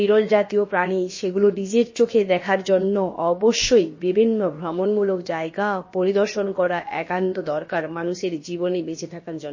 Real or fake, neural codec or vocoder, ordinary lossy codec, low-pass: fake; codec, 16 kHz, about 1 kbps, DyCAST, with the encoder's durations; MP3, 32 kbps; 7.2 kHz